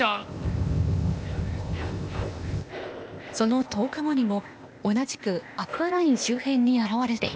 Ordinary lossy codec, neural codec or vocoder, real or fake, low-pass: none; codec, 16 kHz, 0.8 kbps, ZipCodec; fake; none